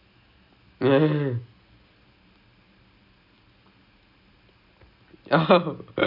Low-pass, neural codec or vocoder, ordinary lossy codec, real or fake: 5.4 kHz; none; none; real